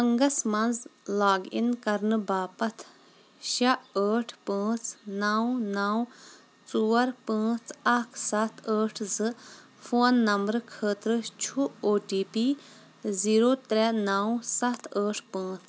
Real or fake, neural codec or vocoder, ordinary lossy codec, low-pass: real; none; none; none